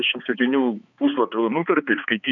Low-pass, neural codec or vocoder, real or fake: 7.2 kHz; codec, 16 kHz, 4 kbps, X-Codec, HuBERT features, trained on balanced general audio; fake